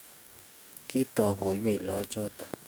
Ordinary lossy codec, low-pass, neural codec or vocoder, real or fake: none; none; codec, 44.1 kHz, 2.6 kbps, DAC; fake